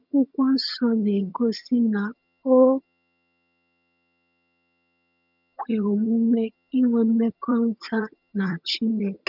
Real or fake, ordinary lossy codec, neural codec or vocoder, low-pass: fake; none; vocoder, 22.05 kHz, 80 mel bands, HiFi-GAN; 5.4 kHz